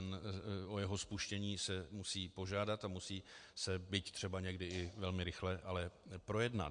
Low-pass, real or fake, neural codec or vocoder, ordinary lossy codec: 9.9 kHz; real; none; MP3, 64 kbps